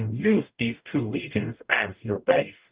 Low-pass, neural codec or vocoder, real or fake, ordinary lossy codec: 3.6 kHz; codec, 44.1 kHz, 0.9 kbps, DAC; fake; Opus, 24 kbps